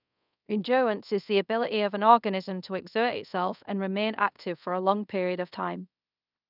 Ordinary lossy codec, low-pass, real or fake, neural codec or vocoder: none; 5.4 kHz; fake; codec, 24 kHz, 0.5 kbps, DualCodec